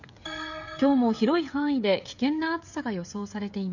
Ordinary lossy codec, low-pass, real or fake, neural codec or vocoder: none; 7.2 kHz; fake; codec, 16 kHz, 16 kbps, FreqCodec, smaller model